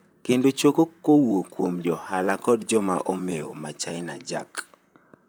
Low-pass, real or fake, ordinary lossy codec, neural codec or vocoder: none; fake; none; vocoder, 44.1 kHz, 128 mel bands, Pupu-Vocoder